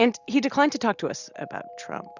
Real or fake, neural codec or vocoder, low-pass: real; none; 7.2 kHz